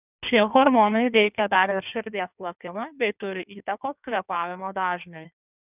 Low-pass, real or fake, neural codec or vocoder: 3.6 kHz; fake; codec, 16 kHz in and 24 kHz out, 1.1 kbps, FireRedTTS-2 codec